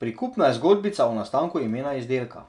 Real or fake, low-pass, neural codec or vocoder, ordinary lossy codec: real; 10.8 kHz; none; none